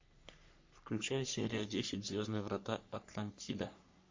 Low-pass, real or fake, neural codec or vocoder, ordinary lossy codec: 7.2 kHz; fake; codec, 44.1 kHz, 3.4 kbps, Pupu-Codec; MP3, 48 kbps